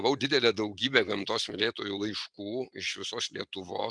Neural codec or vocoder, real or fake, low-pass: none; real; 9.9 kHz